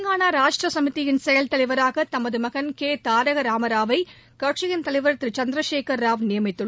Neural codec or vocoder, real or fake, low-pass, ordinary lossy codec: none; real; none; none